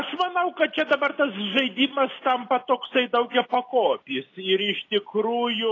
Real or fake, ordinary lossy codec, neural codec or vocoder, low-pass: real; AAC, 32 kbps; none; 7.2 kHz